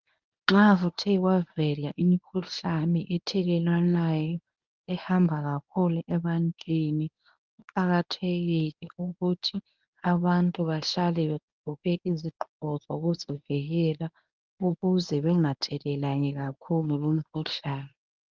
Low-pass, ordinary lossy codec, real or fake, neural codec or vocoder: 7.2 kHz; Opus, 24 kbps; fake; codec, 24 kHz, 0.9 kbps, WavTokenizer, medium speech release version 1